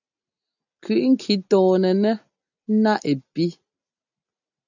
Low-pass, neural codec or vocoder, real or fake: 7.2 kHz; none; real